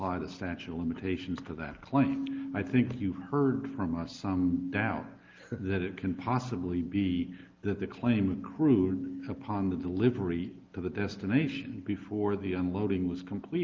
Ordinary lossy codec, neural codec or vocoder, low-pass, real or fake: Opus, 32 kbps; none; 7.2 kHz; real